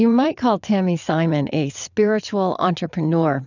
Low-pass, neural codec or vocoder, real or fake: 7.2 kHz; vocoder, 22.05 kHz, 80 mel bands, WaveNeXt; fake